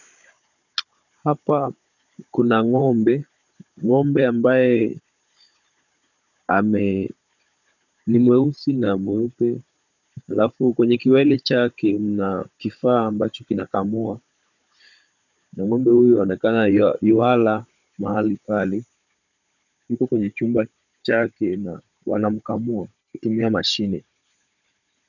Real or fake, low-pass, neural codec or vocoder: fake; 7.2 kHz; codec, 16 kHz, 16 kbps, FunCodec, trained on Chinese and English, 50 frames a second